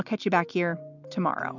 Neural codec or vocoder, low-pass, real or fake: none; 7.2 kHz; real